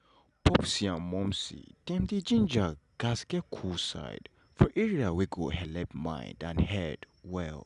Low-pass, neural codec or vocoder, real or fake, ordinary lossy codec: 10.8 kHz; none; real; none